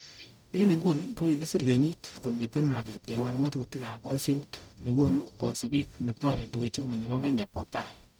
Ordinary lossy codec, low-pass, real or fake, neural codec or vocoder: none; none; fake; codec, 44.1 kHz, 0.9 kbps, DAC